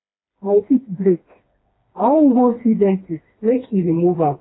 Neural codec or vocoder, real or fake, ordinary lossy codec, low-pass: codec, 16 kHz, 2 kbps, FreqCodec, smaller model; fake; AAC, 16 kbps; 7.2 kHz